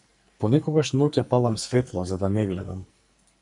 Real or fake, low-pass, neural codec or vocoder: fake; 10.8 kHz; codec, 44.1 kHz, 2.6 kbps, SNAC